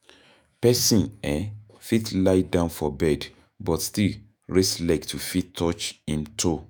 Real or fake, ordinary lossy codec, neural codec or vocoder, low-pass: fake; none; autoencoder, 48 kHz, 128 numbers a frame, DAC-VAE, trained on Japanese speech; none